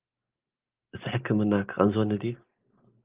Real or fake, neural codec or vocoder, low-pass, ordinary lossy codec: real; none; 3.6 kHz; Opus, 32 kbps